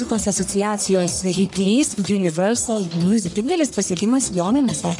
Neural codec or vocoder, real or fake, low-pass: codec, 44.1 kHz, 1.7 kbps, Pupu-Codec; fake; 10.8 kHz